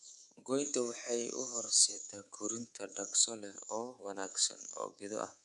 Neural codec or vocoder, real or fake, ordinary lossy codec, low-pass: codec, 24 kHz, 3.1 kbps, DualCodec; fake; none; 10.8 kHz